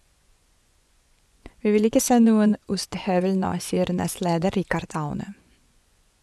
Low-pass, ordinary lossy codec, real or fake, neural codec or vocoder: none; none; fake; vocoder, 24 kHz, 100 mel bands, Vocos